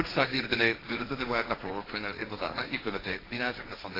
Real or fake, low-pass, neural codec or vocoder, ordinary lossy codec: fake; 5.4 kHz; codec, 16 kHz, 1.1 kbps, Voila-Tokenizer; AAC, 24 kbps